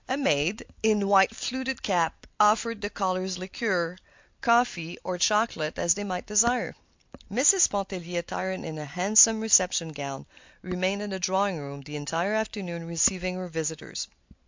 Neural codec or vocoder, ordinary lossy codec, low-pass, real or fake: none; MP3, 64 kbps; 7.2 kHz; real